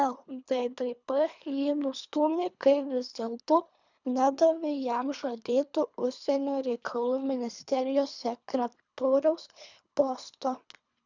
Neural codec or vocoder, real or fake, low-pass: codec, 24 kHz, 3 kbps, HILCodec; fake; 7.2 kHz